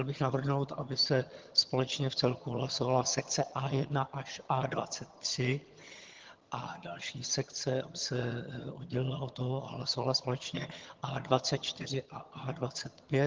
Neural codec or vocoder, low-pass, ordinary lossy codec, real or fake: vocoder, 22.05 kHz, 80 mel bands, HiFi-GAN; 7.2 kHz; Opus, 16 kbps; fake